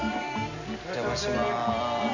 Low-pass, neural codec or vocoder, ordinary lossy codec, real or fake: 7.2 kHz; none; none; real